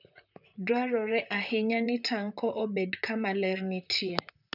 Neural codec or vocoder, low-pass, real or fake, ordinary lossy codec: vocoder, 44.1 kHz, 128 mel bands, Pupu-Vocoder; 5.4 kHz; fake; none